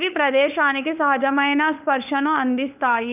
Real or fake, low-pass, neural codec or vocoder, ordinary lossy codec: fake; 3.6 kHz; codec, 16 kHz, 4 kbps, FunCodec, trained on LibriTTS, 50 frames a second; none